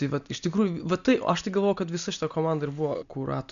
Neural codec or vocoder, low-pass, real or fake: none; 7.2 kHz; real